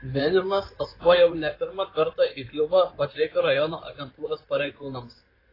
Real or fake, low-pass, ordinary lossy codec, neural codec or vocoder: fake; 5.4 kHz; AAC, 24 kbps; codec, 16 kHz in and 24 kHz out, 2.2 kbps, FireRedTTS-2 codec